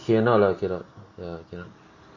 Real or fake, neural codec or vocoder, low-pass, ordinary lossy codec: real; none; 7.2 kHz; MP3, 32 kbps